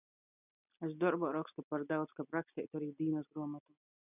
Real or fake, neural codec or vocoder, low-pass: real; none; 3.6 kHz